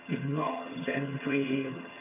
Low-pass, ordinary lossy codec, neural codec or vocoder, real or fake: 3.6 kHz; none; vocoder, 22.05 kHz, 80 mel bands, HiFi-GAN; fake